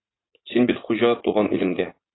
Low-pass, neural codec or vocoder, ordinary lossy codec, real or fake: 7.2 kHz; vocoder, 44.1 kHz, 80 mel bands, Vocos; AAC, 16 kbps; fake